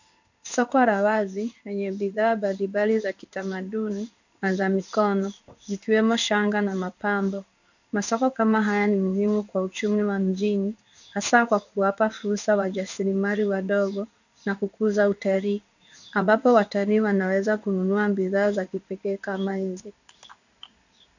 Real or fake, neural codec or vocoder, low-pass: fake; codec, 16 kHz in and 24 kHz out, 1 kbps, XY-Tokenizer; 7.2 kHz